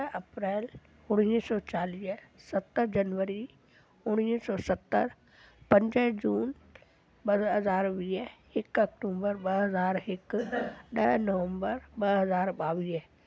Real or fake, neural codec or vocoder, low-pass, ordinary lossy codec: real; none; none; none